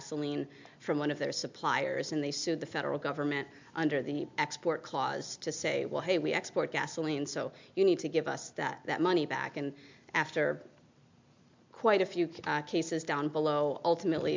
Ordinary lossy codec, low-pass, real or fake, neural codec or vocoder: MP3, 64 kbps; 7.2 kHz; real; none